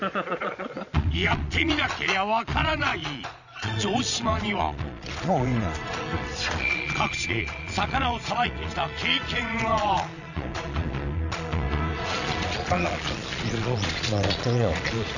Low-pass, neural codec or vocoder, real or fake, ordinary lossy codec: 7.2 kHz; vocoder, 22.05 kHz, 80 mel bands, Vocos; fake; none